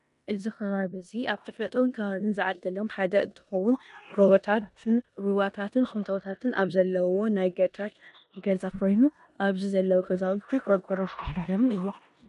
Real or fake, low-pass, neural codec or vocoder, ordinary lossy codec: fake; 10.8 kHz; codec, 16 kHz in and 24 kHz out, 0.9 kbps, LongCat-Audio-Codec, four codebook decoder; MP3, 96 kbps